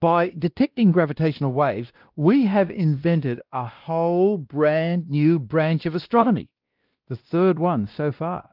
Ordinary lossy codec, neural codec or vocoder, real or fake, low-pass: Opus, 32 kbps; codec, 16 kHz, 1 kbps, X-Codec, WavLM features, trained on Multilingual LibriSpeech; fake; 5.4 kHz